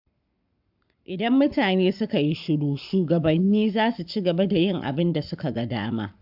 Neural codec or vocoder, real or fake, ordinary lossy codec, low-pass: codec, 44.1 kHz, 7.8 kbps, Pupu-Codec; fake; AAC, 48 kbps; 5.4 kHz